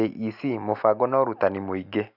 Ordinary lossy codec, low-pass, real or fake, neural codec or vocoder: none; 5.4 kHz; real; none